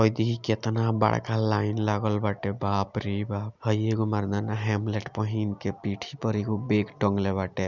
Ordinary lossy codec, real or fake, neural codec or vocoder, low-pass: none; real; none; 7.2 kHz